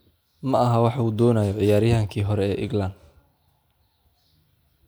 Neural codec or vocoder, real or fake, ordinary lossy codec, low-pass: none; real; none; none